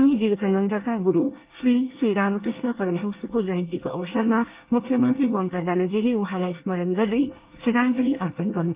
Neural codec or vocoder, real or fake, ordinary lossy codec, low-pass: codec, 24 kHz, 1 kbps, SNAC; fake; Opus, 32 kbps; 3.6 kHz